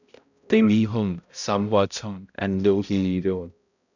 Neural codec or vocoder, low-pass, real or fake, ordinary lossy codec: codec, 16 kHz, 0.5 kbps, X-Codec, HuBERT features, trained on balanced general audio; 7.2 kHz; fake; none